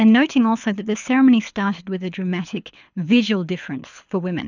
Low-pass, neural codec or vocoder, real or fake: 7.2 kHz; codec, 24 kHz, 6 kbps, HILCodec; fake